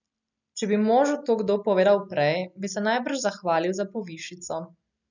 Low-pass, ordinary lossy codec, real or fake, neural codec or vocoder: 7.2 kHz; none; real; none